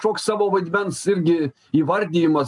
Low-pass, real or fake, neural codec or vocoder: 10.8 kHz; real; none